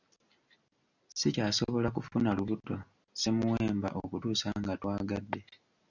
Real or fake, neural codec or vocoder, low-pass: real; none; 7.2 kHz